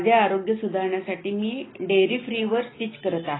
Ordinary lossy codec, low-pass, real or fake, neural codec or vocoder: AAC, 16 kbps; 7.2 kHz; real; none